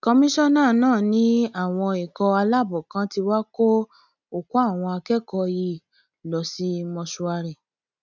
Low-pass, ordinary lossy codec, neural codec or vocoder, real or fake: 7.2 kHz; none; none; real